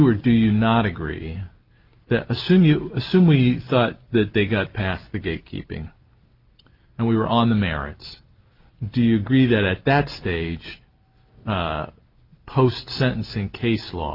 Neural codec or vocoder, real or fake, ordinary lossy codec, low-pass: none; real; Opus, 32 kbps; 5.4 kHz